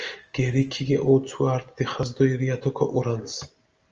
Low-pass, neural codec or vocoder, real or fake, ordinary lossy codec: 7.2 kHz; none; real; Opus, 24 kbps